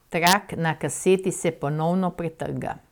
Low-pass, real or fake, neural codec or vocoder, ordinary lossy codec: 19.8 kHz; fake; vocoder, 44.1 kHz, 128 mel bands every 256 samples, BigVGAN v2; none